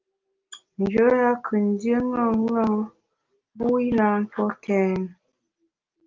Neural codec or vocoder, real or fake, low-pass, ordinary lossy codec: none; real; 7.2 kHz; Opus, 32 kbps